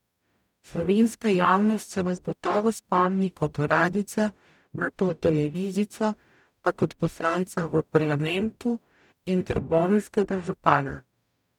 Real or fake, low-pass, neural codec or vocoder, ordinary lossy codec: fake; 19.8 kHz; codec, 44.1 kHz, 0.9 kbps, DAC; none